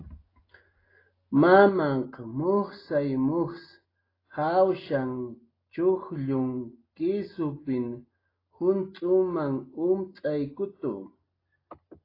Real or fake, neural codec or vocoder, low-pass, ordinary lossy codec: real; none; 5.4 kHz; AAC, 24 kbps